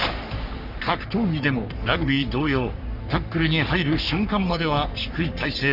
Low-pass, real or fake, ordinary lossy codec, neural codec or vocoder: 5.4 kHz; fake; none; codec, 44.1 kHz, 3.4 kbps, Pupu-Codec